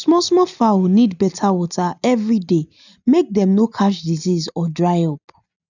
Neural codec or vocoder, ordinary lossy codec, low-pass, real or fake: none; none; 7.2 kHz; real